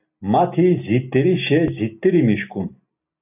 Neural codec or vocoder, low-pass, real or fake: none; 3.6 kHz; real